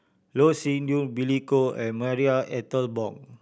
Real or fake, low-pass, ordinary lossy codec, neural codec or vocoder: real; none; none; none